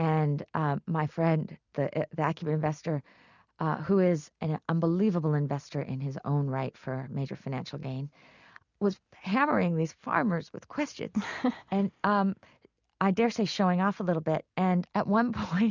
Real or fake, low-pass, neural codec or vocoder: real; 7.2 kHz; none